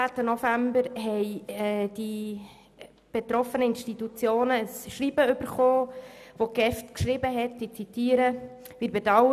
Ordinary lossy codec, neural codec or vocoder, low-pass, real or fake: none; none; 14.4 kHz; real